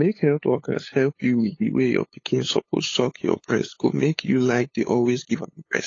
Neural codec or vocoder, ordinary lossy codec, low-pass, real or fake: codec, 16 kHz, 8 kbps, FunCodec, trained on LibriTTS, 25 frames a second; AAC, 32 kbps; 7.2 kHz; fake